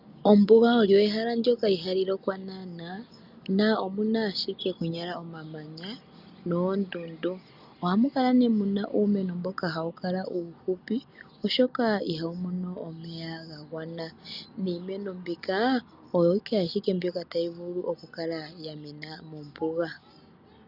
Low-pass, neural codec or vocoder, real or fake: 5.4 kHz; none; real